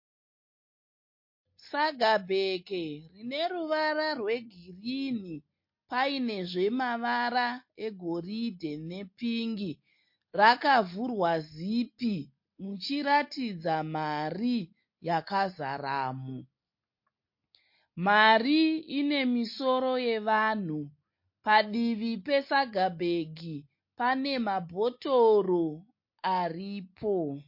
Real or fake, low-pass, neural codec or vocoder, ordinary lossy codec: real; 5.4 kHz; none; MP3, 32 kbps